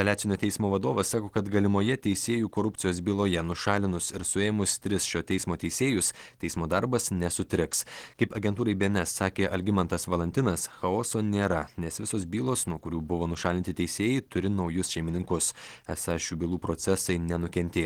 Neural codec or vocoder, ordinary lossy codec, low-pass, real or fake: none; Opus, 16 kbps; 19.8 kHz; real